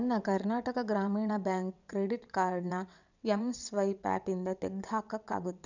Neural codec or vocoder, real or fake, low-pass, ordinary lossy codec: vocoder, 22.05 kHz, 80 mel bands, WaveNeXt; fake; 7.2 kHz; none